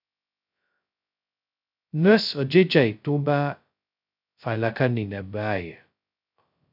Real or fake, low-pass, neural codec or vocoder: fake; 5.4 kHz; codec, 16 kHz, 0.2 kbps, FocalCodec